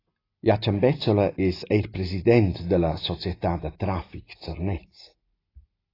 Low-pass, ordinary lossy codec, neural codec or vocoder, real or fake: 5.4 kHz; AAC, 24 kbps; none; real